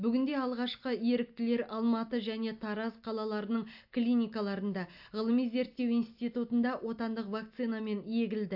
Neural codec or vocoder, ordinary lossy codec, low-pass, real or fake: none; AAC, 48 kbps; 5.4 kHz; real